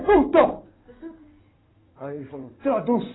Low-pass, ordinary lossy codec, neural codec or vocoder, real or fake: 7.2 kHz; AAC, 16 kbps; codec, 16 kHz in and 24 kHz out, 2.2 kbps, FireRedTTS-2 codec; fake